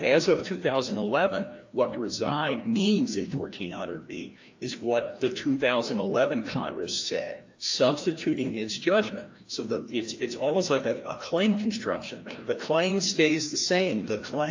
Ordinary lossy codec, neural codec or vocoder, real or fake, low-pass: AAC, 48 kbps; codec, 16 kHz, 1 kbps, FreqCodec, larger model; fake; 7.2 kHz